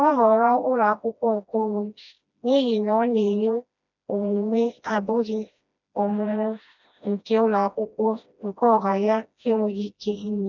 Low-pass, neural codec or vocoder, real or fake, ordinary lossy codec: 7.2 kHz; codec, 16 kHz, 1 kbps, FreqCodec, smaller model; fake; none